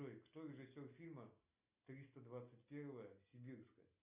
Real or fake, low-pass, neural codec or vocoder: real; 3.6 kHz; none